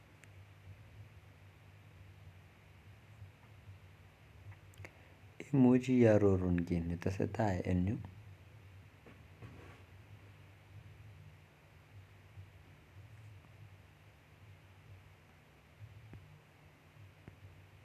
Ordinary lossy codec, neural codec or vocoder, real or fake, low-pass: none; none; real; 14.4 kHz